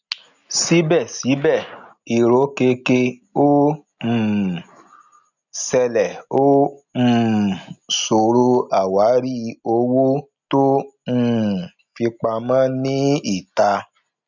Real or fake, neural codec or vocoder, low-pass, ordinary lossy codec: real; none; 7.2 kHz; none